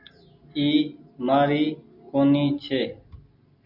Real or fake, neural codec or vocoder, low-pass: real; none; 5.4 kHz